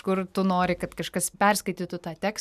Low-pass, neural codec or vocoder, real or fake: 14.4 kHz; none; real